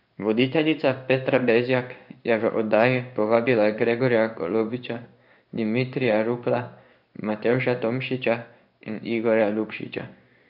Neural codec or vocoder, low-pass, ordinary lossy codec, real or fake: codec, 16 kHz in and 24 kHz out, 1 kbps, XY-Tokenizer; 5.4 kHz; none; fake